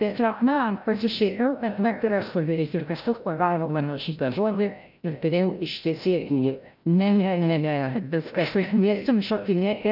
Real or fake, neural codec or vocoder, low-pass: fake; codec, 16 kHz, 0.5 kbps, FreqCodec, larger model; 5.4 kHz